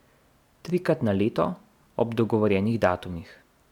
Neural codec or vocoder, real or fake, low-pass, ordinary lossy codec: none; real; 19.8 kHz; none